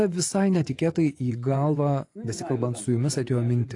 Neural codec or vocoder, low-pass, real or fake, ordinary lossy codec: vocoder, 24 kHz, 100 mel bands, Vocos; 10.8 kHz; fake; AAC, 48 kbps